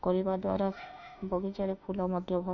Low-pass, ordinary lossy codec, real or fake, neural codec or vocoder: 7.2 kHz; none; fake; autoencoder, 48 kHz, 32 numbers a frame, DAC-VAE, trained on Japanese speech